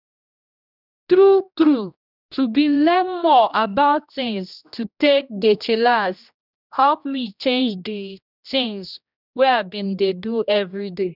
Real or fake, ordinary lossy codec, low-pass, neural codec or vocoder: fake; none; 5.4 kHz; codec, 16 kHz, 1 kbps, X-Codec, HuBERT features, trained on general audio